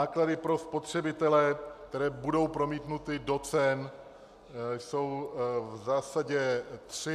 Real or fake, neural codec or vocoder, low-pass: real; none; 14.4 kHz